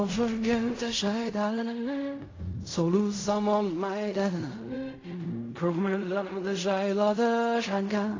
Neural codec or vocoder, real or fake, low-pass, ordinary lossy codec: codec, 16 kHz in and 24 kHz out, 0.4 kbps, LongCat-Audio-Codec, fine tuned four codebook decoder; fake; 7.2 kHz; AAC, 32 kbps